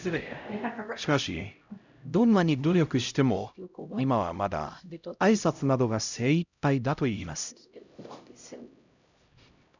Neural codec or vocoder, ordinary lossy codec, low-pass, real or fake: codec, 16 kHz, 0.5 kbps, X-Codec, HuBERT features, trained on LibriSpeech; none; 7.2 kHz; fake